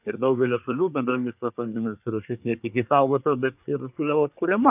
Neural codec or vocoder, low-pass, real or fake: codec, 24 kHz, 1 kbps, SNAC; 3.6 kHz; fake